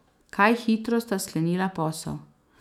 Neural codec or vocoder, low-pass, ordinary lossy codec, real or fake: autoencoder, 48 kHz, 128 numbers a frame, DAC-VAE, trained on Japanese speech; 19.8 kHz; none; fake